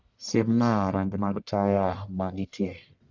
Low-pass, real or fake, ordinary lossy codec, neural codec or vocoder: 7.2 kHz; fake; none; codec, 44.1 kHz, 1.7 kbps, Pupu-Codec